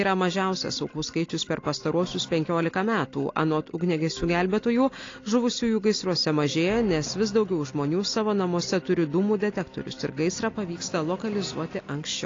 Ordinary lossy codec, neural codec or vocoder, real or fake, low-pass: AAC, 32 kbps; none; real; 7.2 kHz